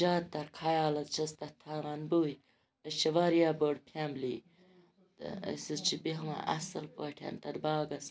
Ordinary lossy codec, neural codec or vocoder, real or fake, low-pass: none; none; real; none